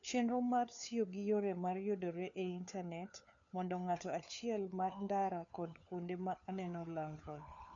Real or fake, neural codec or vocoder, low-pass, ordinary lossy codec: fake; codec, 16 kHz, 4 kbps, FunCodec, trained on LibriTTS, 50 frames a second; 7.2 kHz; none